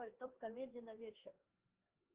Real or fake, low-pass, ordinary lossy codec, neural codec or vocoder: fake; 3.6 kHz; Opus, 16 kbps; codec, 16 kHz, 4 kbps, X-Codec, HuBERT features, trained on LibriSpeech